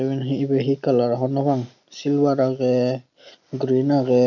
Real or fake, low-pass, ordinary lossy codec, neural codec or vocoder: real; 7.2 kHz; none; none